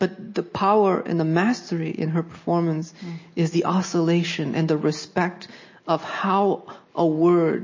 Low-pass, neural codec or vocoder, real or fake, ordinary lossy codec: 7.2 kHz; none; real; MP3, 32 kbps